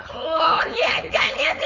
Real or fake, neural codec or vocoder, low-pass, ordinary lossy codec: fake; codec, 16 kHz, 4.8 kbps, FACodec; 7.2 kHz; none